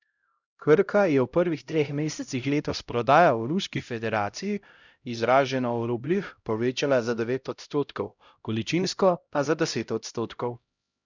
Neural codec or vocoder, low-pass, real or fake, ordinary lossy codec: codec, 16 kHz, 0.5 kbps, X-Codec, HuBERT features, trained on LibriSpeech; 7.2 kHz; fake; none